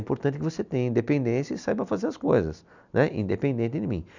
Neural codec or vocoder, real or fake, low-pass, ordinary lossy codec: none; real; 7.2 kHz; none